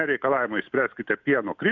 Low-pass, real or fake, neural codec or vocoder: 7.2 kHz; real; none